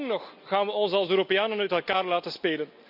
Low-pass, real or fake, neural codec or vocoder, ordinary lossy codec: 5.4 kHz; real; none; none